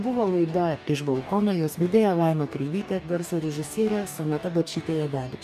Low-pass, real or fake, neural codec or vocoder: 14.4 kHz; fake; codec, 44.1 kHz, 2.6 kbps, DAC